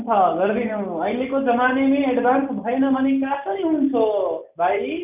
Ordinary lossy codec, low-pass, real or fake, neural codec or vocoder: none; 3.6 kHz; real; none